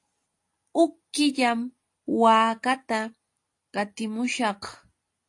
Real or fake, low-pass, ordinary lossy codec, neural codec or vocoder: real; 10.8 kHz; AAC, 48 kbps; none